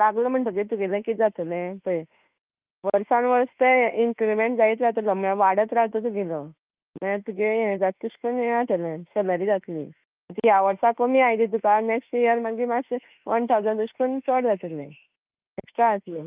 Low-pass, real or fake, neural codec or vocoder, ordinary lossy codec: 3.6 kHz; fake; autoencoder, 48 kHz, 32 numbers a frame, DAC-VAE, trained on Japanese speech; Opus, 32 kbps